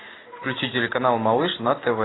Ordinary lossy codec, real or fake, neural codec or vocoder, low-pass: AAC, 16 kbps; real; none; 7.2 kHz